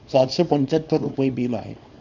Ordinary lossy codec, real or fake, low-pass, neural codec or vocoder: none; fake; 7.2 kHz; codec, 24 kHz, 0.9 kbps, WavTokenizer, small release